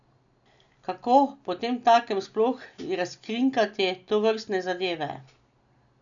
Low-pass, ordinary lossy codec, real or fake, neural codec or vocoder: 7.2 kHz; none; real; none